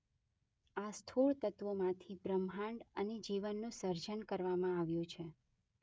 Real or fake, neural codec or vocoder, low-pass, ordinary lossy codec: fake; codec, 16 kHz, 16 kbps, FreqCodec, smaller model; none; none